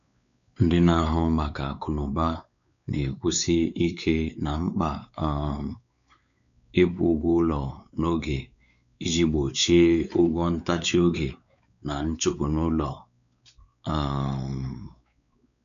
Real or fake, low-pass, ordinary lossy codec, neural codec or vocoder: fake; 7.2 kHz; none; codec, 16 kHz, 4 kbps, X-Codec, WavLM features, trained on Multilingual LibriSpeech